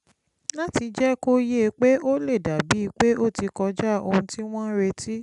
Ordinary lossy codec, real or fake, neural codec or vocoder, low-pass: none; real; none; 10.8 kHz